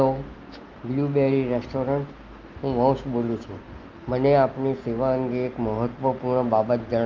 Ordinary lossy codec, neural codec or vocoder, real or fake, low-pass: Opus, 32 kbps; none; real; 7.2 kHz